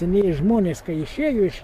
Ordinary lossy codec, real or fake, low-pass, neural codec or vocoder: MP3, 64 kbps; fake; 14.4 kHz; codec, 44.1 kHz, 7.8 kbps, DAC